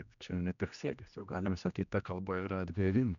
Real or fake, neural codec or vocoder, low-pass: fake; codec, 16 kHz, 1 kbps, X-Codec, HuBERT features, trained on general audio; 7.2 kHz